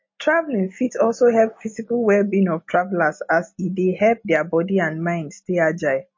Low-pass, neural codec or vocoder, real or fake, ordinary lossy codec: 7.2 kHz; none; real; MP3, 32 kbps